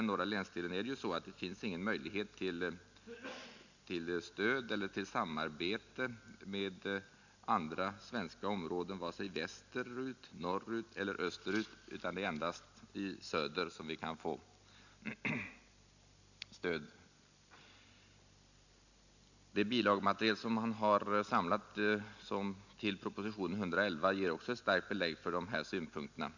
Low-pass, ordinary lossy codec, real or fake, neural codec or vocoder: 7.2 kHz; none; real; none